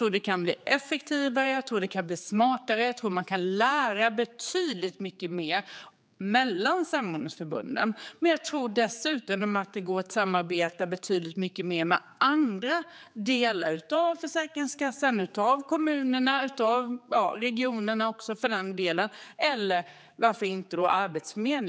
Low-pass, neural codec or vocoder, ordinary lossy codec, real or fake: none; codec, 16 kHz, 4 kbps, X-Codec, HuBERT features, trained on general audio; none; fake